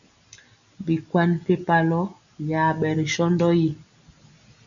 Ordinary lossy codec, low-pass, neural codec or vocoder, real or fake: MP3, 96 kbps; 7.2 kHz; none; real